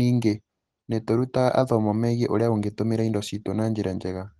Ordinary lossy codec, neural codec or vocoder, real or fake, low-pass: Opus, 16 kbps; none; real; 10.8 kHz